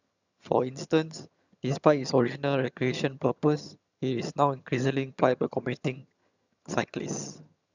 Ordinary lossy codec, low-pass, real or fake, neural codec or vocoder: none; 7.2 kHz; fake; vocoder, 22.05 kHz, 80 mel bands, HiFi-GAN